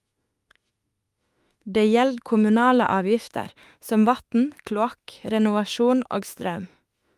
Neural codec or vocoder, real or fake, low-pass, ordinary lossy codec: autoencoder, 48 kHz, 32 numbers a frame, DAC-VAE, trained on Japanese speech; fake; 14.4 kHz; Opus, 32 kbps